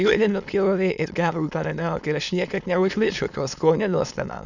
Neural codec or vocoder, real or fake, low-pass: autoencoder, 22.05 kHz, a latent of 192 numbers a frame, VITS, trained on many speakers; fake; 7.2 kHz